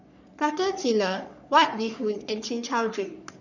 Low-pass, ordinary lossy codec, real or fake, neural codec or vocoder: 7.2 kHz; none; fake; codec, 44.1 kHz, 3.4 kbps, Pupu-Codec